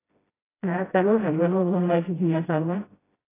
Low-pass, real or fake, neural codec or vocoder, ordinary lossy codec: 3.6 kHz; fake; codec, 16 kHz, 0.5 kbps, FreqCodec, smaller model; AAC, 16 kbps